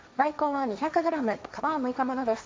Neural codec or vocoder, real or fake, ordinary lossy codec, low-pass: codec, 16 kHz, 1.1 kbps, Voila-Tokenizer; fake; none; none